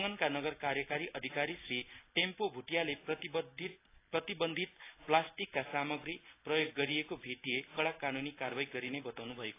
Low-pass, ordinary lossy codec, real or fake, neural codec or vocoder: 3.6 kHz; AAC, 24 kbps; real; none